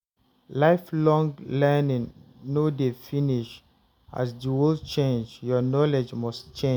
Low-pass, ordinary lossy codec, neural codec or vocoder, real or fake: none; none; none; real